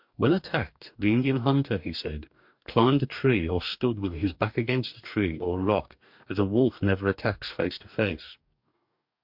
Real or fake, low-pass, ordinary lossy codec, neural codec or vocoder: fake; 5.4 kHz; MP3, 48 kbps; codec, 44.1 kHz, 2.6 kbps, DAC